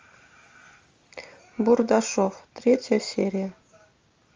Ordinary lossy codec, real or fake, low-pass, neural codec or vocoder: Opus, 32 kbps; real; 7.2 kHz; none